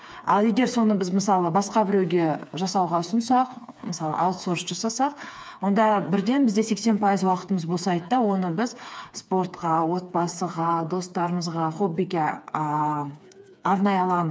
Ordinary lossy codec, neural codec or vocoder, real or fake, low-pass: none; codec, 16 kHz, 4 kbps, FreqCodec, smaller model; fake; none